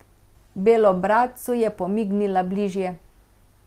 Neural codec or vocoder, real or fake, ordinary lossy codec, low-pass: none; real; Opus, 24 kbps; 14.4 kHz